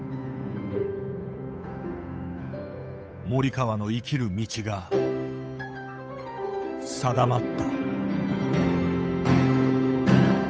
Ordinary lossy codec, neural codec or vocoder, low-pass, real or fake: none; codec, 16 kHz, 8 kbps, FunCodec, trained on Chinese and English, 25 frames a second; none; fake